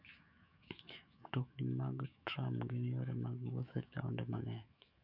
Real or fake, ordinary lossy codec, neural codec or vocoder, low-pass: fake; Opus, 64 kbps; autoencoder, 48 kHz, 128 numbers a frame, DAC-VAE, trained on Japanese speech; 5.4 kHz